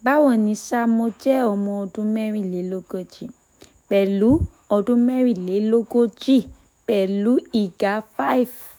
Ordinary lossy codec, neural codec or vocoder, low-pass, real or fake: none; autoencoder, 48 kHz, 128 numbers a frame, DAC-VAE, trained on Japanese speech; none; fake